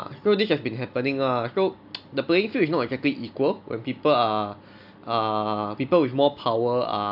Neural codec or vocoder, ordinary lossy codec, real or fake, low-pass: none; AAC, 48 kbps; real; 5.4 kHz